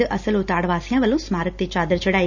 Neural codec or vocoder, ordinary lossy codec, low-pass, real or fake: none; none; 7.2 kHz; real